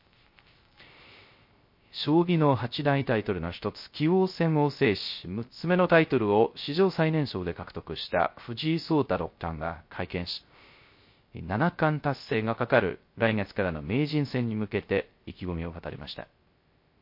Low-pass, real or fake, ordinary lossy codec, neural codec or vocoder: 5.4 kHz; fake; MP3, 32 kbps; codec, 16 kHz, 0.3 kbps, FocalCodec